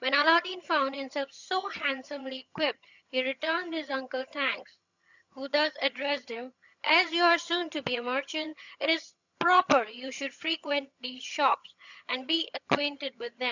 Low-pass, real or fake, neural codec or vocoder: 7.2 kHz; fake; vocoder, 22.05 kHz, 80 mel bands, HiFi-GAN